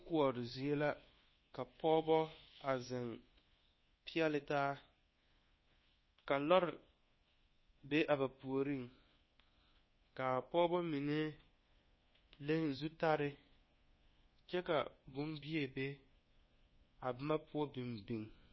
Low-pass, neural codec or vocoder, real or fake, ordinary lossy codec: 7.2 kHz; codec, 24 kHz, 1.2 kbps, DualCodec; fake; MP3, 24 kbps